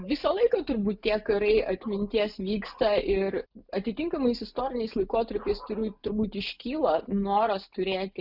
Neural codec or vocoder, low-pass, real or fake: none; 5.4 kHz; real